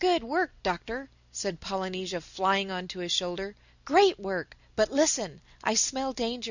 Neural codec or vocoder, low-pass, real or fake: none; 7.2 kHz; real